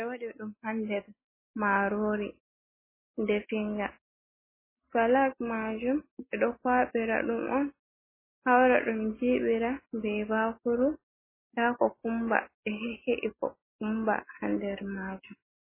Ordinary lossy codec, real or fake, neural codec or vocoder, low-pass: MP3, 16 kbps; real; none; 3.6 kHz